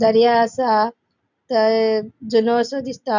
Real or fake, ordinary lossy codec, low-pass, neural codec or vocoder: real; none; 7.2 kHz; none